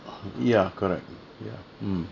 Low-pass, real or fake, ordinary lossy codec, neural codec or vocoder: 7.2 kHz; real; none; none